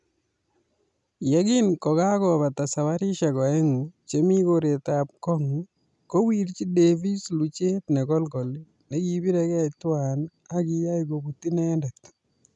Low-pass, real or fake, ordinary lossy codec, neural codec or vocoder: 10.8 kHz; real; none; none